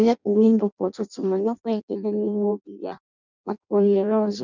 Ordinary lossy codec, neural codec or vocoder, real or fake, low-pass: none; codec, 16 kHz in and 24 kHz out, 0.6 kbps, FireRedTTS-2 codec; fake; 7.2 kHz